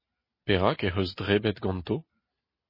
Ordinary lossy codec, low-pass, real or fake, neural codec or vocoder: MP3, 24 kbps; 5.4 kHz; real; none